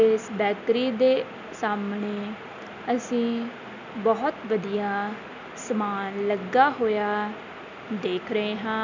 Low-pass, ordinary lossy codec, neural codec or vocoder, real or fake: 7.2 kHz; none; none; real